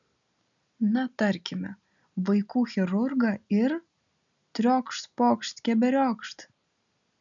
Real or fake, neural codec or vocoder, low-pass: real; none; 7.2 kHz